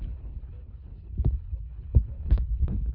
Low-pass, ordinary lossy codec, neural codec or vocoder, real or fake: 5.4 kHz; none; codec, 24 kHz, 3 kbps, HILCodec; fake